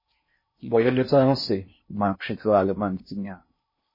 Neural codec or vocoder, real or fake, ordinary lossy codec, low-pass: codec, 16 kHz in and 24 kHz out, 0.6 kbps, FocalCodec, streaming, 4096 codes; fake; MP3, 24 kbps; 5.4 kHz